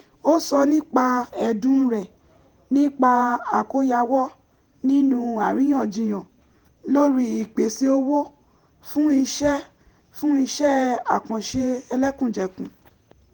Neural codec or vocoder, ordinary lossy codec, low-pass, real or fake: vocoder, 48 kHz, 128 mel bands, Vocos; Opus, 24 kbps; 19.8 kHz; fake